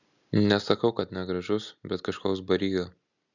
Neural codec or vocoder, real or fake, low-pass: none; real; 7.2 kHz